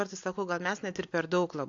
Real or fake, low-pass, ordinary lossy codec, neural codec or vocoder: real; 7.2 kHz; AAC, 48 kbps; none